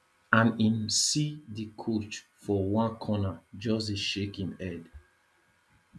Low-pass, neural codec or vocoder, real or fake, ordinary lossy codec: none; none; real; none